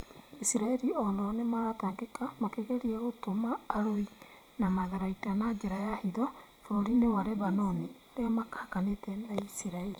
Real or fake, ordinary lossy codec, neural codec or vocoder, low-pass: fake; none; vocoder, 44.1 kHz, 128 mel bands every 512 samples, BigVGAN v2; 19.8 kHz